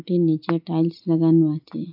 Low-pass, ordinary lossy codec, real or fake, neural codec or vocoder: 5.4 kHz; AAC, 48 kbps; real; none